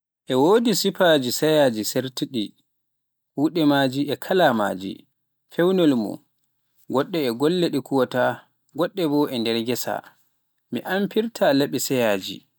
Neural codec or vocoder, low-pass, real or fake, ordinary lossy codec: none; none; real; none